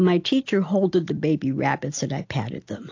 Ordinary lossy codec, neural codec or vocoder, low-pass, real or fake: AAC, 48 kbps; none; 7.2 kHz; real